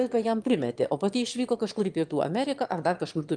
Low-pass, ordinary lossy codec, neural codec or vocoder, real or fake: 9.9 kHz; Opus, 32 kbps; autoencoder, 22.05 kHz, a latent of 192 numbers a frame, VITS, trained on one speaker; fake